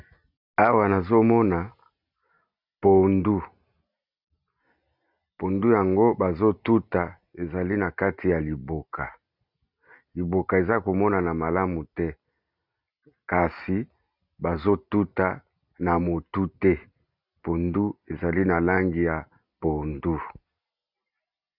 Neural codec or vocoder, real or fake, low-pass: none; real; 5.4 kHz